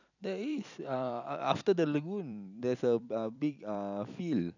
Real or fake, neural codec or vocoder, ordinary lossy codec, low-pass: fake; vocoder, 44.1 kHz, 128 mel bands every 256 samples, BigVGAN v2; none; 7.2 kHz